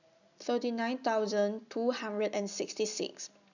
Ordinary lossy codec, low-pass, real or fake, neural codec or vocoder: none; 7.2 kHz; real; none